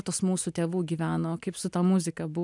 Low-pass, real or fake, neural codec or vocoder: 10.8 kHz; real; none